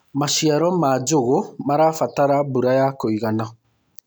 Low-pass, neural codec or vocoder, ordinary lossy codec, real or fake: none; none; none; real